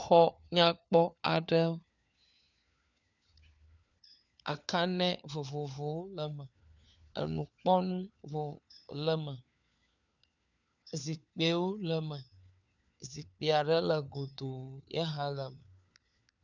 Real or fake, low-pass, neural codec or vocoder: fake; 7.2 kHz; codec, 16 kHz, 4 kbps, FunCodec, trained on LibriTTS, 50 frames a second